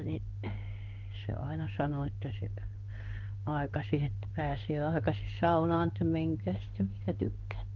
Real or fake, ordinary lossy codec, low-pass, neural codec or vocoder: fake; Opus, 32 kbps; 7.2 kHz; codec, 16 kHz in and 24 kHz out, 1 kbps, XY-Tokenizer